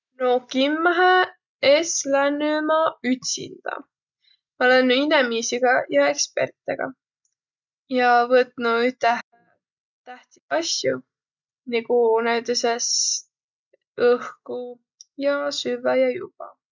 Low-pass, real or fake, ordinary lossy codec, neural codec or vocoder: 7.2 kHz; real; none; none